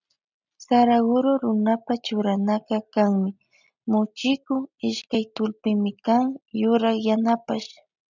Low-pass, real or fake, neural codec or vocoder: 7.2 kHz; real; none